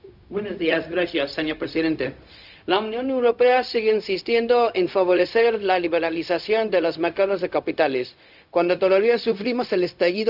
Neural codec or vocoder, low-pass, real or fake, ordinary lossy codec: codec, 16 kHz, 0.4 kbps, LongCat-Audio-Codec; 5.4 kHz; fake; none